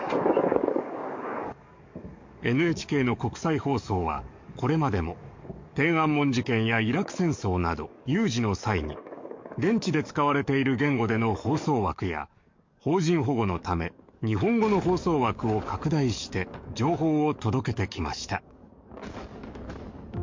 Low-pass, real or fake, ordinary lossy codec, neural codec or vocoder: 7.2 kHz; fake; MP3, 48 kbps; codec, 44.1 kHz, 7.8 kbps, DAC